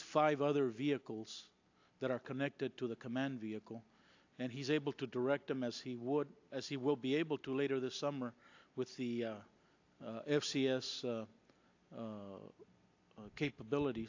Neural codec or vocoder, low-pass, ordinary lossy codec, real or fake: none; 7.2 kHz; AAC, 48 kbps; real